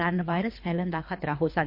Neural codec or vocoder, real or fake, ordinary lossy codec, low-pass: codec, 24 kHz, 3 kbps, HILCodec; fake; MP3, 32 kbps; 5.4 kHz